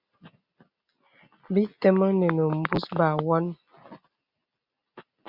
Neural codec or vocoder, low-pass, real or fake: none; 5.4 kHz; real